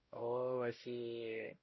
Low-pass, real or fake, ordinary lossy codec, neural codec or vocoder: 7.2 kHz; fake; MP3, 24 kbps; codec, 16 kHz, 0.5 kbps, X-Codec, WavLM features, trained on Multilingual LibriSpeech